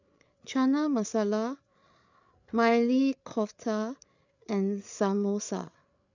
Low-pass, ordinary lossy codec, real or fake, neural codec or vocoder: 7.2 kHz; none; fake; codec, 16 kHz, 8 kbps, FreqCodec, larger model